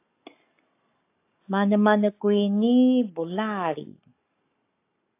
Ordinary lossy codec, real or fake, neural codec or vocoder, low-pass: AAC, 24 kbps; fake; codec, 44.1 kHz, 7.8 kbps, Pupu-Codec; 3.6 kHz